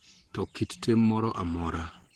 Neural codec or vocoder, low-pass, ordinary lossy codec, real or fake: codec, 44.1 kHz, 7.8 kbps, Pupu-Codec; 19.8 kHz; Opus, 16 kbps; fake